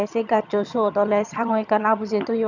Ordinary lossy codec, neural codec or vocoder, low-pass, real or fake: none; vocoder, 22.05 kHz, 80 mel bands, HiFi-GAN; 7.2 kHz; fake